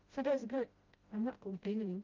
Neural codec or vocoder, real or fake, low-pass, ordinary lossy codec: codec, 16 kHz, 0.5 kbps, FreqCodec, smaller model; fake; 7.2 kHz; Opus, 24 kbps